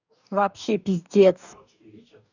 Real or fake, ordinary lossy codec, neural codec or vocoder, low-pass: fake; none; codec, 44.1 kHz, 2.6 kbps, DAC; 7.2 kHz